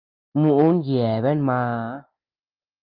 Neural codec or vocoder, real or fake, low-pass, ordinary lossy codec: none; real; 5.4 kHz; Opus, 32 kbps